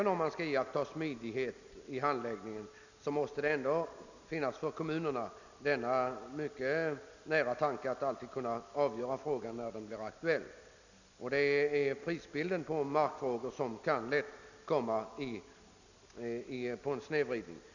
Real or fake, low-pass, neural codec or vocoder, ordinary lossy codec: real; 7.2 kHz; none; none